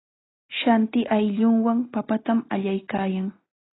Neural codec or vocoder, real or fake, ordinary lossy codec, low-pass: none; real; AAC, 16 kbps; 7.2 kHz